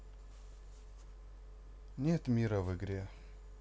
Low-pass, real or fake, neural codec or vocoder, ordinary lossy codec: none; real; none; none